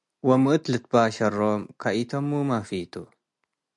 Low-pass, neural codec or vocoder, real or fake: 10.8 kHz; none; real